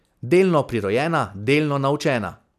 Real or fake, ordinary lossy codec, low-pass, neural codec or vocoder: real; none; 14.4 kHz; none